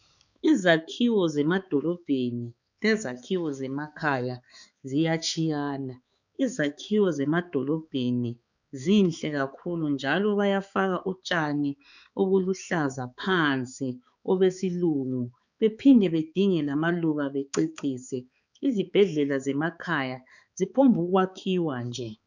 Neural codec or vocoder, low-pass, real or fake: codec, 16 kHz, 4 kbps, X-Codec, HuBERT features, trained on balanced general audio; 7.2 kHz; fake